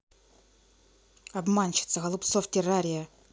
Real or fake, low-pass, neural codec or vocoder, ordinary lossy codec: real; none; none; none